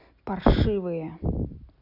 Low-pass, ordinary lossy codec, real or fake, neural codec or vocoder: 5.4 kHz; none; real; none